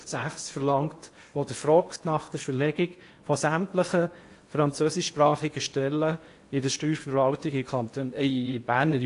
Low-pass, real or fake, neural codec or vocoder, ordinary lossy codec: 10.8 kHz; fake; codec, 16 kHz in and 24 kHz out, 0.8 kbps, FocalCodec, streaming, 65536 codes; AAC, 48 kbps